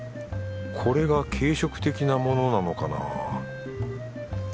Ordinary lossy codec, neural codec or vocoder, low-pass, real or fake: none; none; none; real